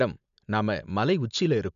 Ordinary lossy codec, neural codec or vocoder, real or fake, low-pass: none; none; real; 7.2 kHz